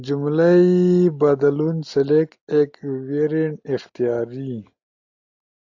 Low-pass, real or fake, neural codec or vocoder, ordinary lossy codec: 7.2 kHz; real; none; AAC, 48 kbps